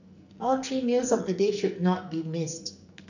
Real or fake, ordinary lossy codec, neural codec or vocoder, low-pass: fake; none; codec, 44.1 kHz, 2.6 kbps, SNAC; 7.2 kHz